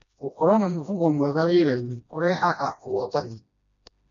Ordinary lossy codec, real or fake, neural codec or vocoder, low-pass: none; fake; codec, 16 kHz, 1 kbps, FreqCodec, smaller model; 7.2 kHz